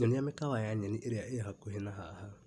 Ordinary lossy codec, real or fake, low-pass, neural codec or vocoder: none; real; none; none